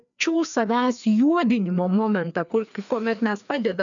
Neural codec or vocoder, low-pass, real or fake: codec, 16 kHz, 2 kbps, FreqCodec, larger model; 7.2 kHz; fake